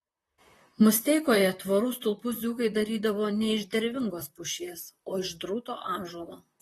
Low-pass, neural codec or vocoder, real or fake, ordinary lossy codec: 19.8 kHz; vocoder, 44.1 kHz, 128 mel bands, Pupu-Vocoder; fake; AAC, 32 kbps